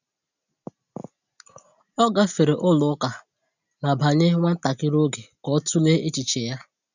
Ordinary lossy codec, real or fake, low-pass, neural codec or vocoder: none; real; 7.2 kHz; none